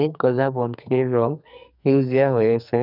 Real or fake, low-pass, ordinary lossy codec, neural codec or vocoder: fake; 5.4 kHz; none; codec, 44.1 kHz, 2.6 kbps, SNAC